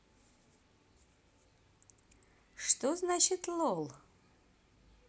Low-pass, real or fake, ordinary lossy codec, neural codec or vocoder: none; real; none; none